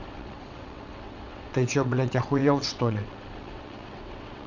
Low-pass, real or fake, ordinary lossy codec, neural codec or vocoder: 7.2 kHz; fake; Opus, 64 kbps; vocoder, 22.05 kHz, 80 mel bands, WaveNeXt